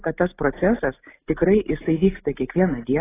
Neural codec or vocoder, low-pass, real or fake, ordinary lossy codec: none; 3.6 kHz; real; AAC, 16 kbps